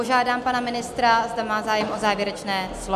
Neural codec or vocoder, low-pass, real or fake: none; 14.4 kHz; real